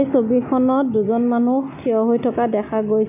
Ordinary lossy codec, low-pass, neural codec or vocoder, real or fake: none; 3.6 kHz; none; real